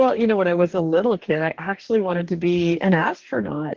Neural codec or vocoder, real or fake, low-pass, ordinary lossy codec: codec, 44.1 kHz, 2.6 kbps, DAC; fake; 7.2 kHz; Opus, 16 kbps